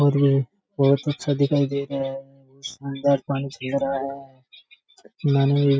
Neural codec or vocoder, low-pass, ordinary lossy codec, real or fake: none; none; none; real